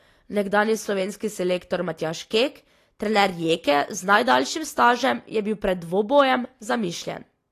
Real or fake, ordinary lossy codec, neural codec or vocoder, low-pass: real; AAC, 48 kbps; none; 14.4 kHz